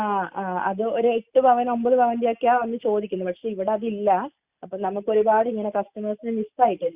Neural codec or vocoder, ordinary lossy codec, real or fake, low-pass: none; Opus, 64 kbps; real; 3.6 kHz